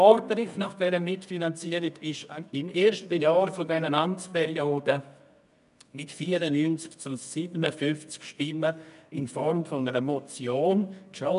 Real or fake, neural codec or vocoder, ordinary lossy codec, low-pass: fake; codec, 24 kHz, 0.9 kbps, WavTokenizer, medium music audio release; none; 10.8 kHz